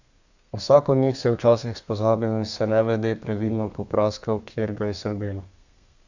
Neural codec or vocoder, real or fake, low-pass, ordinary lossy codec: codec, 32 kHz, 1.9 kbps, SNAC; fake; 7.2 kHz; none